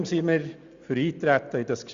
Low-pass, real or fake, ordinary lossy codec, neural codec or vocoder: 7.2 kHz; real; Opus, 64 kbps; none